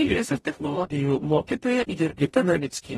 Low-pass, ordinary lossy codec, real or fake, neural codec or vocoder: 19.8 kHz; AAC, 32 kbps; fake; codec, 44.1 kHz, 0.9 kbps, DAC